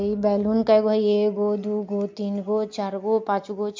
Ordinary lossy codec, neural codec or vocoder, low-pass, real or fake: MP3, 48 kbps; none; 7.2 kHz; real